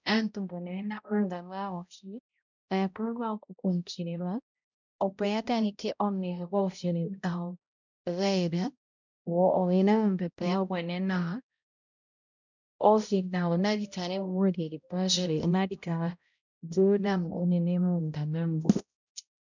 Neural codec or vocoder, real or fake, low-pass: codec, 16 kHz, 0.5 kbps, X-Codec, HuBERT features, trained on balanced general audio; fake; 7.2 kHz